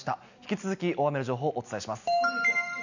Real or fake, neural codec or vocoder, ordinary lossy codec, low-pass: real; none; none; 7.2 kHz